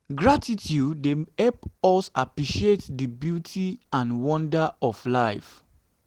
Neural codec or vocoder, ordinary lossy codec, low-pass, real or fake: none; Opus, 16 kbps; 19.8 kHz; real